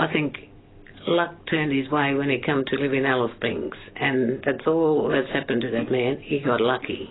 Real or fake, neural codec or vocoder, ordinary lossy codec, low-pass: real; none; AAC, 16 kbps; 7.2 kHz